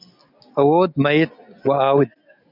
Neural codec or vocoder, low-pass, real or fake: none; 5.4 kHz; real